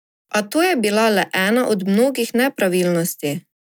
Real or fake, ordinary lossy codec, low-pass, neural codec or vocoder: real; none; none; none